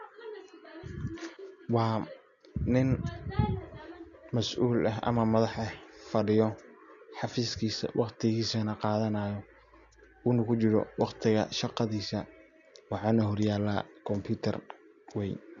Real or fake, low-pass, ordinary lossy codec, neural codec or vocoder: real; 7.2 kHz; none; none